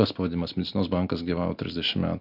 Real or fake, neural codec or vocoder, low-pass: real; none; 5.4 kHz